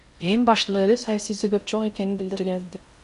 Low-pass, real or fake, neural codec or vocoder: 10.8 kHz; fake; codec, 16 kHz in and 24 kHz out, 0.6 kbps, FocalCodec, streaming, 4096 codes